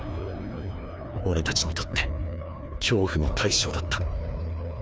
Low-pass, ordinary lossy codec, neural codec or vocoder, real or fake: none; none; codec, 16 kHz, 2 kbps, FreqCodec, larger model; fake